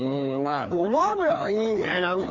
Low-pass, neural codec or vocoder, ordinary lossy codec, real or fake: 7.2 kHz; codec, 16 kHz, 2 kbps, FreqCodec, larger model; none; fake